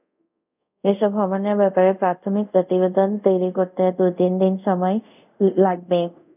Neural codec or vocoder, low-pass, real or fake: codec, 24 kHz, 0.5 kbps, DualCodec; 3.6 kHz; fake